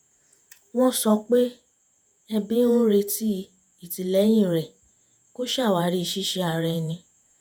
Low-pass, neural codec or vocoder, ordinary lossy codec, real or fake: none; vocoder, 48 kHz, 128 mel bands, Vocos; none; fake